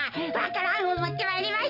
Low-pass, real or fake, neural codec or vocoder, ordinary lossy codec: 5.4 kHz; real; none; none